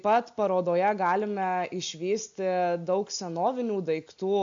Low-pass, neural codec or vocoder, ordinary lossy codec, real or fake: 7.2 kHz; none; AAC, 64 kbps; real